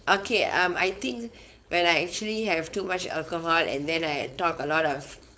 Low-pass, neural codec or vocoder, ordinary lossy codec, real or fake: none; codec, 16 kHz, 4.8 kbps, FACodec; none; fake